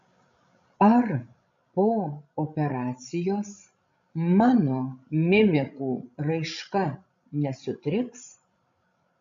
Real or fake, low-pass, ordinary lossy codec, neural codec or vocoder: fake; 7.2 kHz; MP3, 48 kbps; codec, 16 kHz, 16 kbps, FreqCodec, larger model